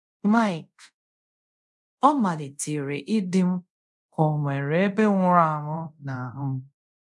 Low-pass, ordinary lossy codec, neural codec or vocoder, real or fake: none; none; codec, 24 kHz, 0.5 kbps, DualCodec; fake